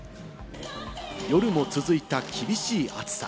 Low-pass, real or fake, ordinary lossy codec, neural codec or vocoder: none; real; none; none